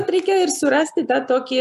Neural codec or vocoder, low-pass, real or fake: none; 14.4 kHz; real